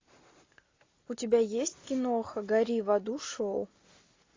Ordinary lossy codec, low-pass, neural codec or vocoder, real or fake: AAC, 32 kbps; 7.2 kHz; none; real